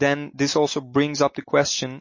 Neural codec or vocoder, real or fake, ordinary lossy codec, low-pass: none; real; MP3, 32 kbps; 7.2 kHz